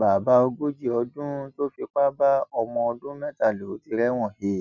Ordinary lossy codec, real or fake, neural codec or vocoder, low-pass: none; real; none; 7.2 kHz